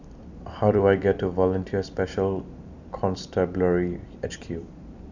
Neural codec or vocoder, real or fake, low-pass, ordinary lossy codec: vocoder, 44.1 kHz, 128 mel bands every 256 samples, BigVGAN v2; fake; 7.2 kHz; none